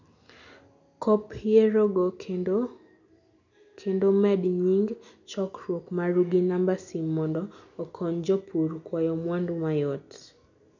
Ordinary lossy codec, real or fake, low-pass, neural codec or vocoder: none; real; 7.2 kHz; none